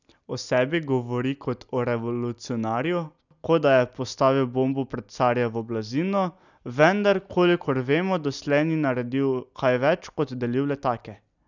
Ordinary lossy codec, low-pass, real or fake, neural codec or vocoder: none; 7.2 kHz; real; none